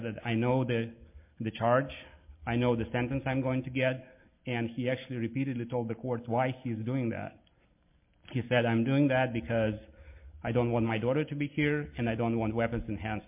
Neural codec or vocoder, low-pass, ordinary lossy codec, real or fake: none; 3.6 kHz; MP3, 32 kbps; real